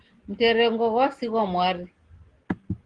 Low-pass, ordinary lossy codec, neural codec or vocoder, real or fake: 9.9 kHz; Opus, 16 kbps; none; real